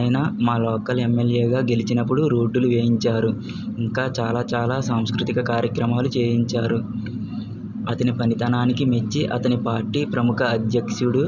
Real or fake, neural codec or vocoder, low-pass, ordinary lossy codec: real; none; 7.2 kHz; none